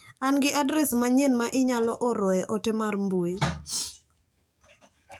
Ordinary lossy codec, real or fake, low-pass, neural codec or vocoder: none; fake; 19.8 kHz; codec, 44.1 kHz, 7.8 kbps, DAC